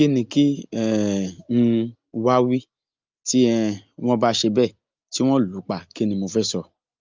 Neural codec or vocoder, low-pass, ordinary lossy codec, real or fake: none; 7.2 kHz; Opus, 24 kbps; real